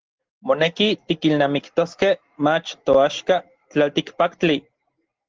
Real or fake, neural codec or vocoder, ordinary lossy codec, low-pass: real; none; Opus, 16 kbps; 7.2 kHz